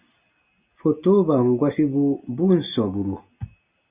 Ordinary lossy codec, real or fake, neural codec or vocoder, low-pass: Opus, 64 kbps; real; none; 3.6 kHz